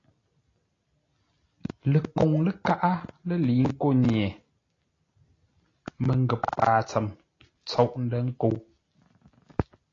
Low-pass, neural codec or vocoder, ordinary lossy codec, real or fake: 7.2 kHz; none; AAC, 32 kbps; real